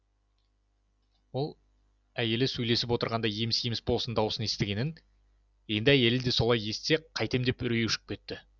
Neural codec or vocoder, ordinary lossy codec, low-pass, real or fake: none; none; 7.2 kHz; real